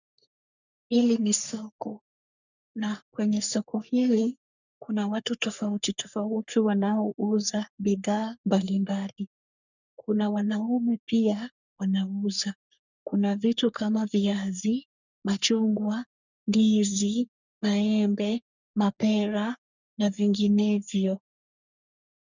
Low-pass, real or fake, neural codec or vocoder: 7.2 kHz; fake; codec, 44.1 kHz, 3.4 kbps, Pupu-Codec